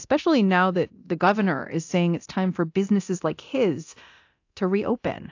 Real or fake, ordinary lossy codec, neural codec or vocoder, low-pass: fake; AAC, 48 kbps; codec, 24 kHz, 0.9 kbps, DualCodec; 7.2 kHz